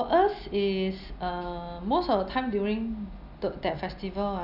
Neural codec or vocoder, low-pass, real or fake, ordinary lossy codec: none; 5.4 kHz; real; none